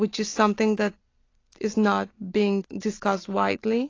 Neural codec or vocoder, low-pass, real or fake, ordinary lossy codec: none; 7.2 kHz; real; AAC, 32 kbps